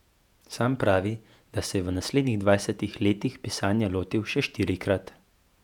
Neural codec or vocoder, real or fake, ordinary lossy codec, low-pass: vocoder, 48 kHz, 128 mel bands, Vocos; fake; none; 19.8 kHz